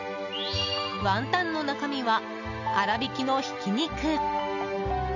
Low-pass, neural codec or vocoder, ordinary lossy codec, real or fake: 7.2 kHz; none; none; real